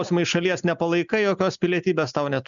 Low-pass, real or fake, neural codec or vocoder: 7.2 kHz; real; none